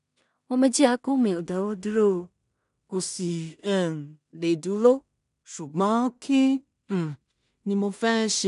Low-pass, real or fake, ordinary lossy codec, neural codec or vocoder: 10.8 kHz; fake; none; codec, 16 kHz in and 24 kHz out, 0.4 kbps, LongCat-Audio-Codec, two codebook decoder